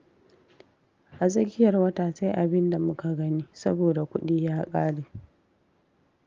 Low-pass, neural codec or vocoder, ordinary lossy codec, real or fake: 7.2 kHz; none; Opus, 32 kbps; real